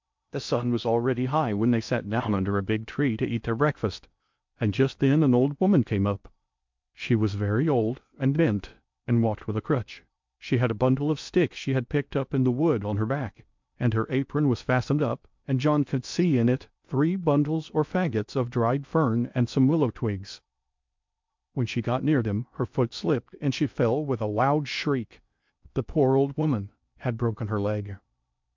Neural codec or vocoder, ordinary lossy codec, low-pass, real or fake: codec, 16 kHz in and 24 kHz out, 0.6 kbps, FocalCodec, streaming, 2048 codes; MP3, 64 kbps; 7.2 kHz; fake